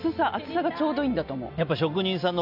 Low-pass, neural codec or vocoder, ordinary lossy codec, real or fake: 5.4 kHz; none; none; real